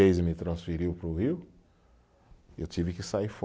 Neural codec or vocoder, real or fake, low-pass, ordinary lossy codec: none; real; none; none